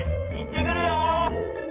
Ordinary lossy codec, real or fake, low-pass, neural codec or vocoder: Opus, 24 kbps; fake; 3.6 kHz; vocoder, 22.05 kHz, 80 mel bands, Vocos